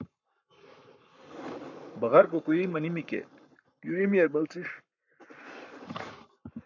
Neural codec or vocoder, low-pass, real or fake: codec, 44.1 kHz, 7.8 kbps, Pupu-Codec; 7.2 kHz; fake